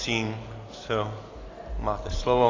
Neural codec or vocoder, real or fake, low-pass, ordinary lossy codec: none; real; 7.2 kHz; AAC, 32 kbps